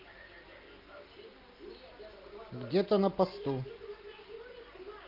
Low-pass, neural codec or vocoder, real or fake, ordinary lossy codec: 5.4 kHz; none; real; Opus, 32 kbps